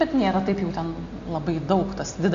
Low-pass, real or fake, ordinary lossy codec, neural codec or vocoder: 7.2 kHz; real; MP3, 48 kbps; none